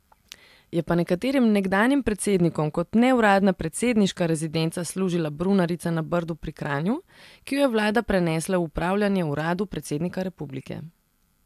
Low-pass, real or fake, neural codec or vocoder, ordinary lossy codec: 14.4 kHz; real; none; AAC, 96 kbps